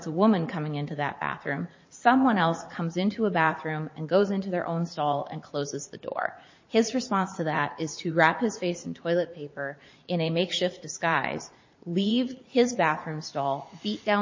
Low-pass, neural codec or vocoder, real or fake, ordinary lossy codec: 7.2 kHz; vocoder, 44.1 kHz, 80 mel bands, Vocos; fake; MP3, 32 kbps